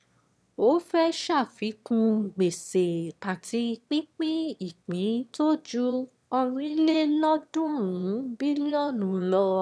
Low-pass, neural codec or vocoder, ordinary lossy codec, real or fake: none; autoencoder, 22.05 kHz, a latent of 192 numbers a frame, VITS, trained on one speaker; none; fake